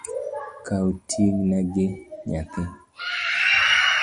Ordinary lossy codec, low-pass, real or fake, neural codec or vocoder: Opus, 64 kbps; 9.9 kHz; real; none